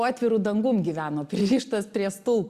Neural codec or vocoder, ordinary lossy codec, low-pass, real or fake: none; Opus, 64 kbps; 14.4 kHz; real